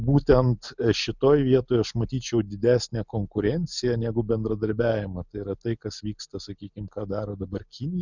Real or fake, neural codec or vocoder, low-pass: real; none; 7.2 kHz